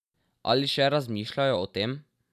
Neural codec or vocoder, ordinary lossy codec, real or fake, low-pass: none; none; real; 14.4 kHz